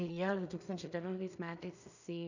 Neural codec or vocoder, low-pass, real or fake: codec, 16 kHz in and 24 kHz out, 0.4 kbps, LongCat-Audio-Codec, two codebook decoder; 7.2 kHz; fake